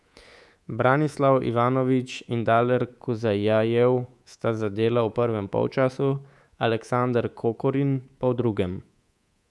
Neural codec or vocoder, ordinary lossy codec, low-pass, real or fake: codec, 24 kHz, 3.1 kbps, DualCodec; none; none; fake